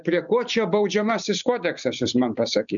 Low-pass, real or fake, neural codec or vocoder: 7.2 kHz; real; none